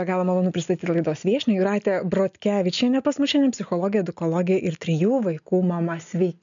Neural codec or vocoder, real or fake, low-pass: none; real; 7.2 kHz